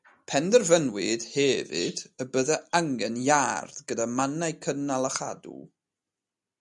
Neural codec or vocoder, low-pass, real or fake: none; 10.8 kHz; real